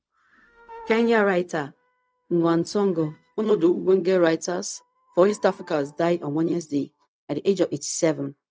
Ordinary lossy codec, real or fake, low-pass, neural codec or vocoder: none; fake; none; codec, 16 kHz, 0.4 kbps, LongCat-Audio-Codec